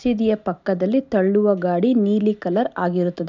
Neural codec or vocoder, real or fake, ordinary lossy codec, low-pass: none; real; none; 7.2 kHz